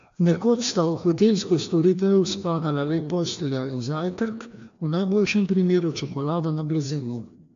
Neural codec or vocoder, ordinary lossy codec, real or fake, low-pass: codec, 16 kHz, 1 kbps, FreqCodec, larger model; AAC, 64 kbps; fake; 7.2 kHz